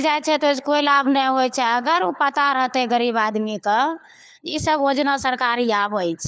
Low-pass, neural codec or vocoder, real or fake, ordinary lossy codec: none; codec, 16 kHz, 4 kbps, FunCodec, trained on LibriTTS, 50 frames a second; fake; none